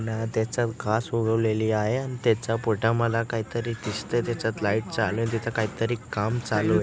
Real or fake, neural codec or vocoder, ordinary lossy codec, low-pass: real; none; none; none